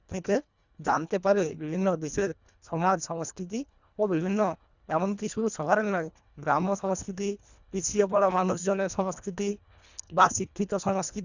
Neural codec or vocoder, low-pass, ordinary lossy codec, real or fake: codec, 24 kHz, 1.5 kbps, HILCodec; 7.2 kHz; Opus, 64 kbps; fake